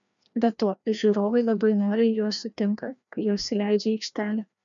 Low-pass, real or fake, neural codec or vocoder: 7.2 kHz; fake; codec, 16 kHz, 1 kbps, FreqCodec, larger model